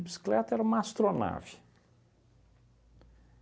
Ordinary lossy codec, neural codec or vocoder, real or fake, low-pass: none; none; real; none